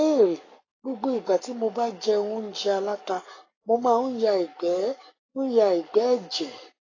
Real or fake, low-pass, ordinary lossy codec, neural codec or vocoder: fake; 7.2 kHz; AAC, 32 kbps; codec, 44.1 kHz, 7.8 kbps, Pupu-Codec